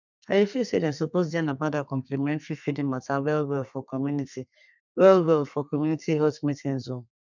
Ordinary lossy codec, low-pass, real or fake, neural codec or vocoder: none; 7.2 kHz; fake; codec, 32 kHz, 1.9 kbps, SNAC